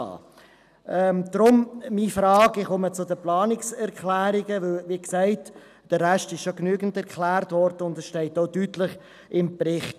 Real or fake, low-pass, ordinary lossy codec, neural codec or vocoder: real; 14.4 kHz; none; none